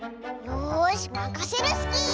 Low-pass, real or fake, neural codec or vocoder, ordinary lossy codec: none; real; none; none